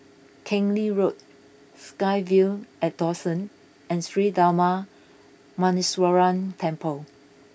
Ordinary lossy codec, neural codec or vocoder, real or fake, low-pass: none; none; real; none